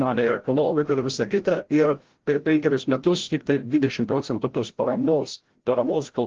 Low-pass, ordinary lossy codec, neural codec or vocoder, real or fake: 7.2 kHz; Opus, 16 kbps; codec, 16 kHz, 0.5 kbps, FreqCodec, larger model; fake